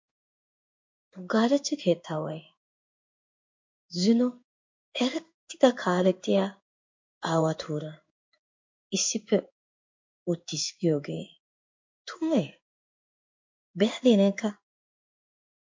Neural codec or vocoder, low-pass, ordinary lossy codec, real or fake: codec, 16 kHz in and 24 kHz out, 1 kbps, XY-Tokenizer; 7.2 kHz; MP3, 48 kbps; fake